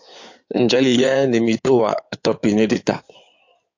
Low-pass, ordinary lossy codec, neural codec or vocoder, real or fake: 7.2 kHz; AAC, 48 kbps; codec, 16 kHz in and 24 kHz out, 2.2 kbps, FireRedTTS-2 codec; fake